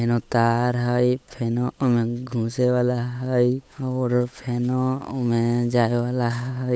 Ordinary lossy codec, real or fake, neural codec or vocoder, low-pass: none; fake; codec, 16 kHz, 8 kbps, FunCodec, trained on Chinese and English, 25 frames a second; none